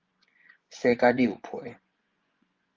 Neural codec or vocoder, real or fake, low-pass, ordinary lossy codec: vocoder, 24 kHz, 100 mel bands, Vocos; fake; 7.2 kHz; Opus, 32 kbps